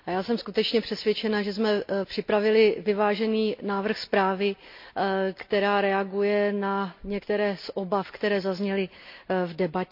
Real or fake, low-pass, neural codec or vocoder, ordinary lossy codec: real; 5.4 kHz; none; MP3, 48 kbps